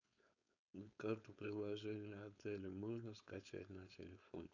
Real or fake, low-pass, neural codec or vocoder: fake; 7.2 kHz; codec, 16 kHz, 4.8 kbps, FACodec